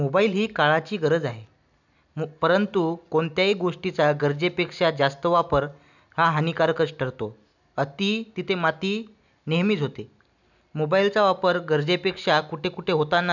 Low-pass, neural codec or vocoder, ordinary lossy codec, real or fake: 7.2 kHz; none; none; real